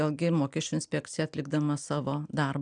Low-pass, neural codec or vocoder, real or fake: 9.9 kHz; none; real